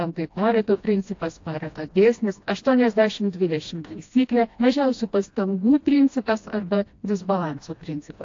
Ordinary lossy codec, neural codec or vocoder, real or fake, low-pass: AAC, 48 kbps; codec, 16 kHz, 1 kbps, FreqCodec, smaller model; fake; 7.2 kHz